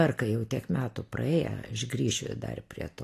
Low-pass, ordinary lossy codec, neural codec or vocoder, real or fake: 14.4 kHz; AAC, 48 kbps; none; real